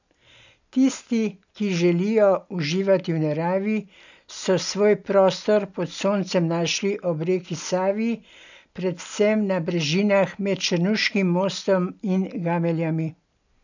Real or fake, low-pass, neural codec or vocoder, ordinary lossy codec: real; 7.2 kHz; none; none